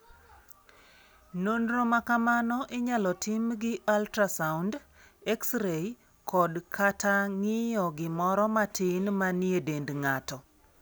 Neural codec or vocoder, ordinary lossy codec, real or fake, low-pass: none; none; real; none